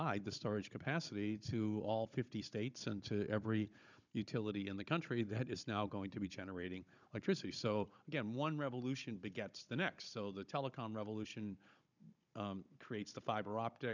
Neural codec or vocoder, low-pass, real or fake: codec, 16 kHz, 16 kbps, FunCodec, trained on Chinese and English, 50 frames a second; 7.2 kHz; fake